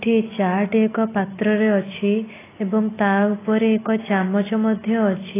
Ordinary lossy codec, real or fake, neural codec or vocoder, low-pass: AAC, 16 kbps; real; none; 3.6 kHz